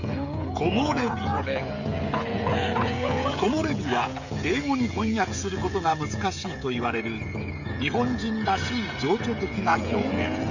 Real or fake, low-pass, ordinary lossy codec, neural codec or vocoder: fake; 7.2 kHz; none; codec, 16 kHz, 16 kbps, FreqCodec, smaller model